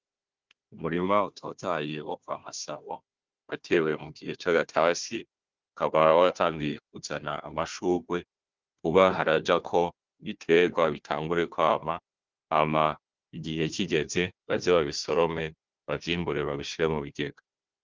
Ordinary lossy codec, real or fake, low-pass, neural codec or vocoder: Opus, 32 kbps; fake; 7.2 kHz; codec, 16 kHz, 1 kbps, FunCodec, trained on Chinese and English, 50 frames a second